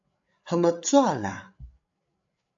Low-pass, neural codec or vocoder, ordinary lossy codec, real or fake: 7.2 kHz; codec, 16 kHz, 8 kbps, FreqCodec, larger model; AAC, 64 kbps; fake